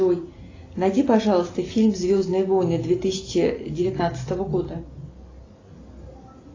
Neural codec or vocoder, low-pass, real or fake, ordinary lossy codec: none; 7.2 kHz; real; AAC, 32 kbps